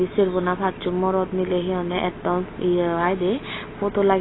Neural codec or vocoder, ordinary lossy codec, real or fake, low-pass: none; AAC, 16 kbps; real; 7.2 kHz